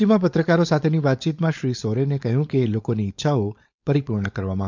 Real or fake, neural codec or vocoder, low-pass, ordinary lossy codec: fake; codec, 16 kHz, 4.8 kbps, FACodec; 7.2 kHz; MP3, 64 kbps